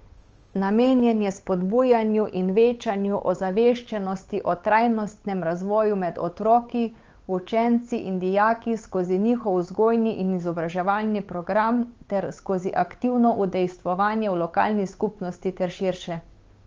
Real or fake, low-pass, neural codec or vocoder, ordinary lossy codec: fake; 7.2 kHz; codec, 16 kHz, 8 kbps, FunCodec, trained on Chinese and English, 25 frames a second; Opus, 24 kbps